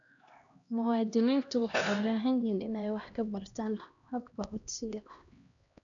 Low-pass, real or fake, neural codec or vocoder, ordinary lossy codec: 7.2 kHz; fake; codec, 16 kHz, 1 kbps, X-Codec, HuBERT features, trained on LibriSpeech; none